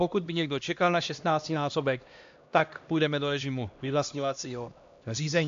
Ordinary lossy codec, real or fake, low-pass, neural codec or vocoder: AAC, 64 kbps; fake; 7.2 kHz; codec, 16 kHz, 1 kbps, X-Codec, HuBERT features, trained on LibriSpeech